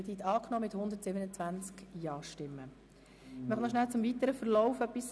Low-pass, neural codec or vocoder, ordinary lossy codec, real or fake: none; none; none; real